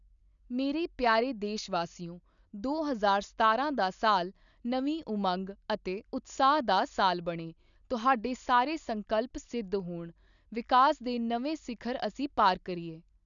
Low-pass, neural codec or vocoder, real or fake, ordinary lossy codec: 7.2 kHz; none; real; none